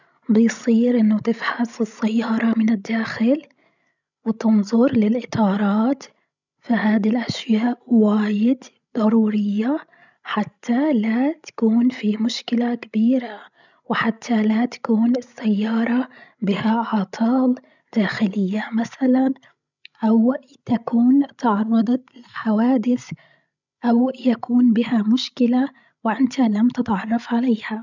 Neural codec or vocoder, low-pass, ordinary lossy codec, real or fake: codec, 16 kHz, 16 kbps, FreqCodec, larger model; 7.2 kHz; none; fake